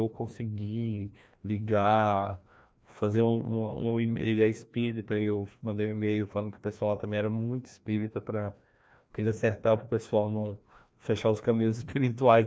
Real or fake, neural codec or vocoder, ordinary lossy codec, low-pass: fake; codec, 16 kHz, 1 kbps, FreqCodec, larger model; none; none